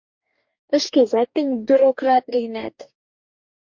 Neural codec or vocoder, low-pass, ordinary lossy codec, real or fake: codec, 44.1 kHz, 2.6 kbps, DAC; 7.2 kHz; MP3, 48 kbps; fake